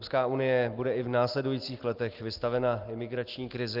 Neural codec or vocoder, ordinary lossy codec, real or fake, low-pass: none; Opus, 32 kbps; real; 5.4 kHz